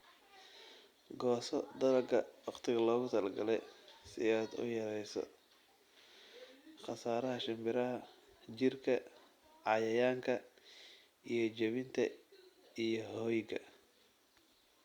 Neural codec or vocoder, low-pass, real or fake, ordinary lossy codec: none; 19.8 kHz; real; Opus, 64 kbps